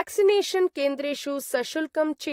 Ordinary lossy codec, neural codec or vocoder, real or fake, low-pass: AAC, 48 kbps; vocoder, 44.1 kHz, 128 mel bands, Pupu-Vocoder; fake; 19.8 kHz